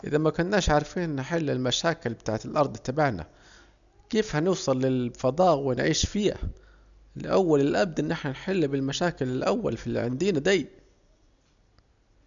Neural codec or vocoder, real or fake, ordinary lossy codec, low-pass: none; real; none; 7.2 kHz